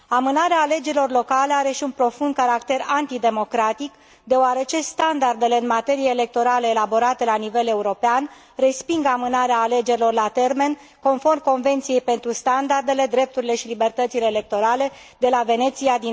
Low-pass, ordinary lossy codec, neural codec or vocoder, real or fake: none; none; none; real